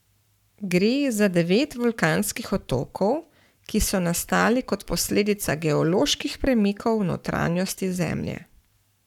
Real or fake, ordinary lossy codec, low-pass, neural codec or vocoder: fake; none; 19.8 kHz; codec, 44.1 kHz, 7.8 kbps, Pupu-Codec